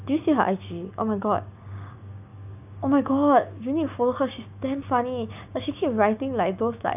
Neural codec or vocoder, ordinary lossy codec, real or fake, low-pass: codec, 16 kHz, 6 kbps, DAC; none; fake; 3.6 kHz